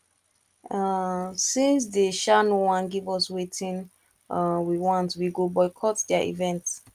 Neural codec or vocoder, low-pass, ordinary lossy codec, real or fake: none; 14.4 kHz; Opus, 32 kbps; real